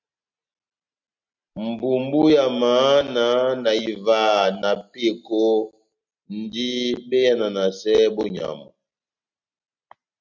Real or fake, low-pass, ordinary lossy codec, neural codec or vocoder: real; 7.2 kHz; MP3, 64 kbps; none